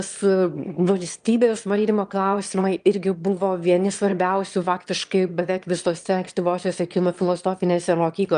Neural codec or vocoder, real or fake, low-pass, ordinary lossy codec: autoencoder, 22.05 kHz, a latent of 192 numbers a frame, VITS, trained on one speaker; fake; 9.9 kHz; Opus, 32 kbps